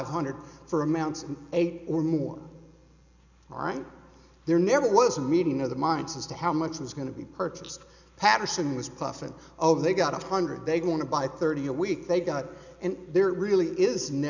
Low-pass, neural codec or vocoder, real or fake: 7.2 kHz; none; real